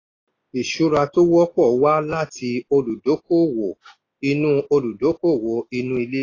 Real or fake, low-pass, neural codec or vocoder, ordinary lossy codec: real; 7.2 kHz; none; AAC, 32 kbps